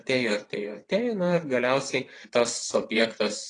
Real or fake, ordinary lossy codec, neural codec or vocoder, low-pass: fake; AAC, 32 kbps; vocoder, 22.05 kHz, 80 mel bands, WaveNeXt; 9.9 kHz